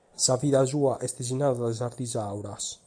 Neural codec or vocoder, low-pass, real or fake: none; 9.9 kHz; real